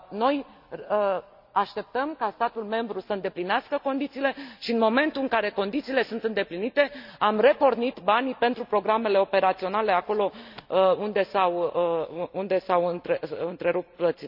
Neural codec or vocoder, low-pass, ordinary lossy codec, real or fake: none; 5.4 kHz; none; real